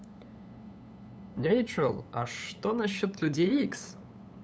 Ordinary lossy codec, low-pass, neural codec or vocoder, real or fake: none; none; codec, 16 kHz, 8 kbps, FunCodec, trained on LibriTTS, 25 frames a second; fake